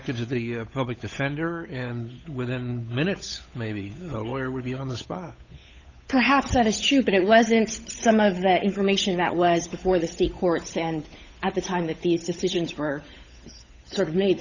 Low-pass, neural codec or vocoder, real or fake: 7.2 kHz; codec, 16 kHz, 8 kbps, FunCodec, trained on Chinese and English, 25 frames a second; fake